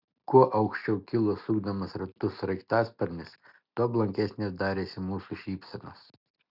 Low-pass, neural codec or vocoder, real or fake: 5.4 kHz; none; real